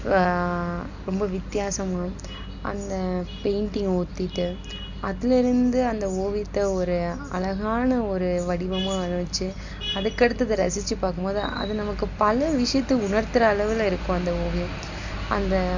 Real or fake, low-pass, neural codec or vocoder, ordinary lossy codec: real; 7.2 kHz; none; none